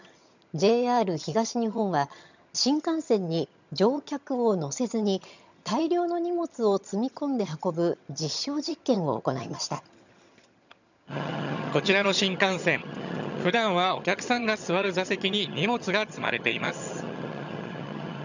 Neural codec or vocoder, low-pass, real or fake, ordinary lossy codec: vocoder, 22.05 kHz, 80 mel bands, HiFi-GAN; 7.2 kHz; fake; none